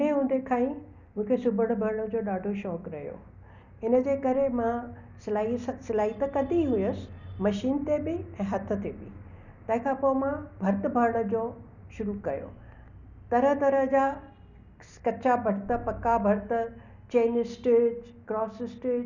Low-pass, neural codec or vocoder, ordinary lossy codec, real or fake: 7.2 kHz; none; none; real